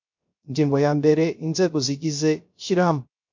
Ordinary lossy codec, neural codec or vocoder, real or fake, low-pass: MP3, 48 kbps; codec, 16 kHz, 0.3 kbps, FocalCodec; fake; 7.2 kHz